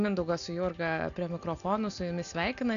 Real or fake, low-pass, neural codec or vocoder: real; 7.2 kHz; none